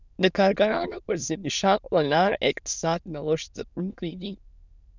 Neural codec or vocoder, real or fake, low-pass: autoencoder, 22.05 kHz, a latent of 192 numbers a frame, VITS, trained on many speakers; fake; 7.2 kHz